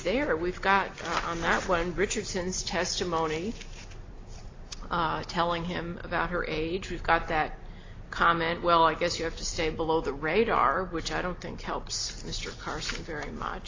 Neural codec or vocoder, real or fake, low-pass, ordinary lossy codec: none; real; 7.2 kHz; AAC, 32 kbps